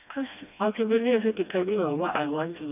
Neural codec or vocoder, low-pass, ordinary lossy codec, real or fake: codec, 16 kHz, 1 kbps, FreqCodec, smaller model; 3.6 kHz; none; fake